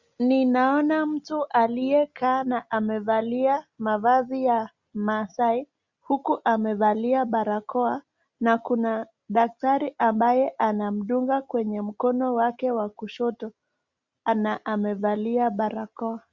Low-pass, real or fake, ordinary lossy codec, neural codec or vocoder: 7.2 kHz; real; Opus, 64 kbps; none